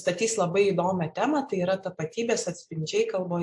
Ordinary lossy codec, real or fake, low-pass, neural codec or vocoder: AAC, 64 kbps; real; 10.8 kHz; none